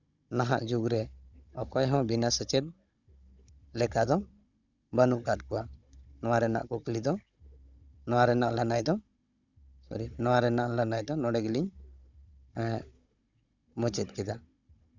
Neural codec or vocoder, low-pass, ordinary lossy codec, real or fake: codec, 16 kHz, 16 kbps, FunCodec, trained on Chinese and English, 50 frames a second; 7.2 kHz; Opus, 64 kbps; fake